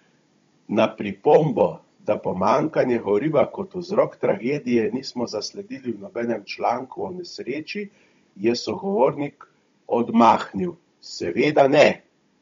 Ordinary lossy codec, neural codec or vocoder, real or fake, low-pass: MP3, 48 kbps; codec, 16 kHz, 16 kbps, FunCodec, trained on Chinese and English, 50 frames a second; fake; 7.2 kHz